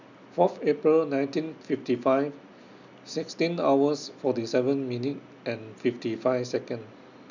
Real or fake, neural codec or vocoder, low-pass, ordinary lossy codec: real; none; 7.2 kHz; none